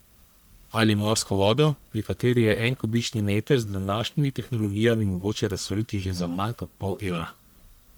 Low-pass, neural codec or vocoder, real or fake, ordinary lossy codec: none; codec, 44.1 kHz, 1.7 kbps, Pupu-Codec; fake; none